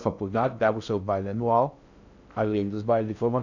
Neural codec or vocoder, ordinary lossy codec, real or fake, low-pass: codec, 16 kHz in and 24 kHz out, 0.6 kbps, FocalCodec, streaming, 2048 codes; none; fake; 7.2 kHz